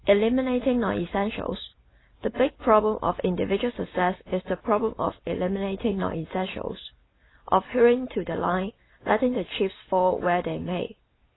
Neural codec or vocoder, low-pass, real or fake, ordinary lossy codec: none; 7.2 kHz; real; AAC, 16 kbps